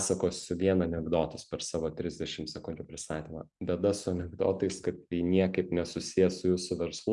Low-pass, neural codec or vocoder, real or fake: 10.8 kHz; none; real